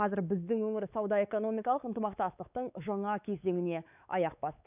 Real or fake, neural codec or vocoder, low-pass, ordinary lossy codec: fake; codec, 16 kHz, 4 kbps, X-Codec, WavLM features, trained on Multilingual LibriSpeech; 3.6 kHz; none